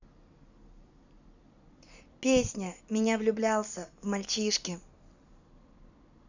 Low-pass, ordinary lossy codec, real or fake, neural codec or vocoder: 7.2 kHz; none; fake; vocoder, 44.1 kHz, 128 mel bands every 256 samples, BigVGAN v2